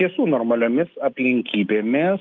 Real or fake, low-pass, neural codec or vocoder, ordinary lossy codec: real; 7.2 kHz; none; Opus, 24 kbps